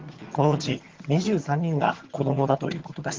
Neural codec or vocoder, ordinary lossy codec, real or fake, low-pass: vocoder, 22.05 kHz, 80 mel bands, HiFi-GAN; Opus, 16 kbps; fake; 7.2 kHz